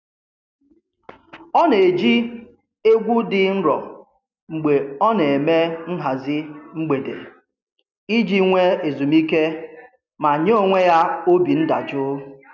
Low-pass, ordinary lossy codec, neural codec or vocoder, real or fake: 7.2 kHz; none; none; real